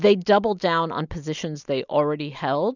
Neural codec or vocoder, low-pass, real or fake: none; 7.2 kHz; real